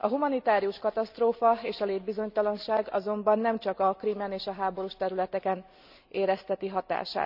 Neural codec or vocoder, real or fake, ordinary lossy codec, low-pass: none; real; none; 5.4 kHz